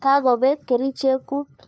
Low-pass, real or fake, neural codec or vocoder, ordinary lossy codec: none; fake; codec, 16 kHz, 2 kbps, FunCodec, trained on LibriTTS, 25 frames a second; none